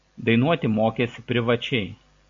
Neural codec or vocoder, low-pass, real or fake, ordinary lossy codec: none; 7.2 kHz; real; AAC, 64 kbps